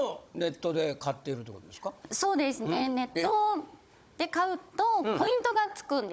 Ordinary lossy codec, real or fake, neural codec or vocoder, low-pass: none; fake; codec, 16 kHz, 16 kbps, FunCodec, trained on Chinese and English, 50 frames a second; none